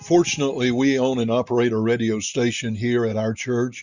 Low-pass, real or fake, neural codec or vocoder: 7.2 kHz; real; none